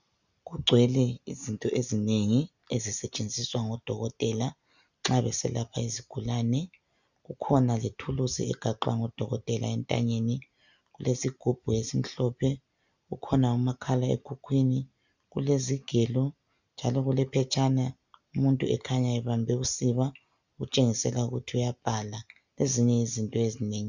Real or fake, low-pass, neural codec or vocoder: real; 7.2 kHz; none